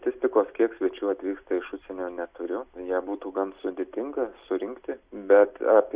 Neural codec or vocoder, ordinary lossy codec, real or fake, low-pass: none; Opus, 64 kbps; real; 3.6 kHz